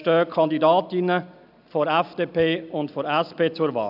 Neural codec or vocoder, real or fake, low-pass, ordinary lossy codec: none; real; 5.4 kHz; none